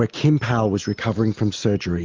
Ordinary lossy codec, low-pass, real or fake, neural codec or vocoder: Opus, 32 kbps; 7.2 kHz; real; none